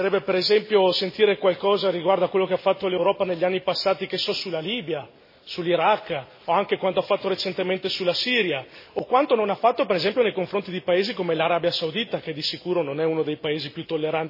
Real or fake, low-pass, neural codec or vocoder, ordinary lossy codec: real; 5.4 kHz; none; MP3, 24 kbps